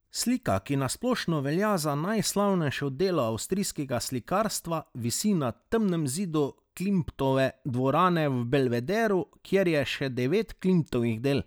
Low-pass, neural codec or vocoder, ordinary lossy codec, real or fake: none; none; none; real